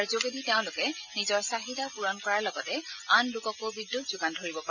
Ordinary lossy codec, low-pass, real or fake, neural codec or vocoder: none; 7.2 kHz; real; none